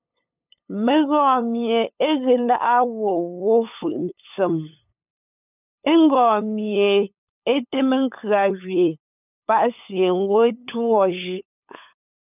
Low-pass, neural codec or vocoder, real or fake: 3.6 kHz; codec, 16 kHz, 8 kbps, FunCodec, trained on LibriTTS, 25 frames a second; fake